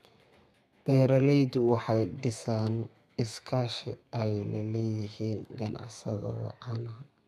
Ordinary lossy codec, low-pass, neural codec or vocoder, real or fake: none; 14.4 kHz; codec, 32 kHz, 1.9 kbps, SNAC; fake